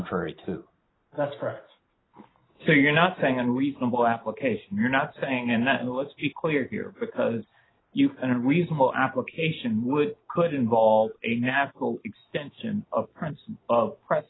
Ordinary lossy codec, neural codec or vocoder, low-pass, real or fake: AAC, 16 kbps; none; 7.2 kHz; real